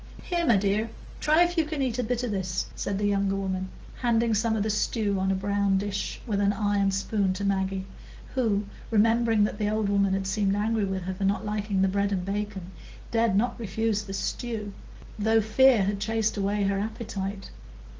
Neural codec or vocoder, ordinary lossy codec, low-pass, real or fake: none; Opus, 16 kbps; 7.2 kHz; real